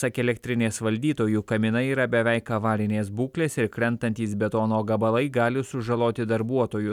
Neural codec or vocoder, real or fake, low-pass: none; real; 19.8 kHz